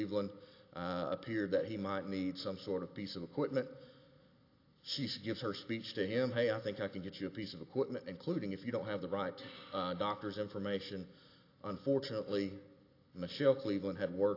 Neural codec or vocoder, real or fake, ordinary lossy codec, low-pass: none; real; AAC, 32 kbps; 5.4 kHz